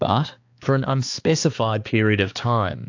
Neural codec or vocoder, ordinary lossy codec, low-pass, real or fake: codec, 16 kHz, 2 kbps, X-Codec, HuBERT features, trained on balanced general audio; AAC, 48 kbps; 7.2 kHz; fake